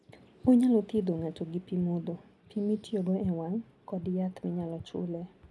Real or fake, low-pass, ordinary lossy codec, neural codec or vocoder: real; none; none; none